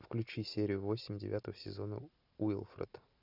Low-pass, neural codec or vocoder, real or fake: 5.4 kHz; none; real